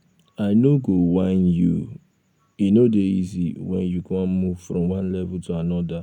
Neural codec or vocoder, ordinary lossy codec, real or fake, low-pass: none; none; real; 19.8 kHz